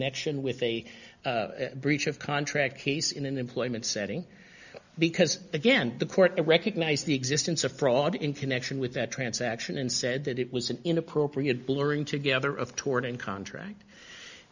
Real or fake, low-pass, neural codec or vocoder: real; 7.2 kHz; none